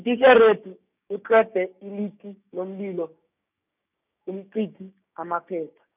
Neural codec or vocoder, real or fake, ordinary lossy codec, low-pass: codec, 16 kHz, 6 kbps, DAC; fake; none; 3.6 kHz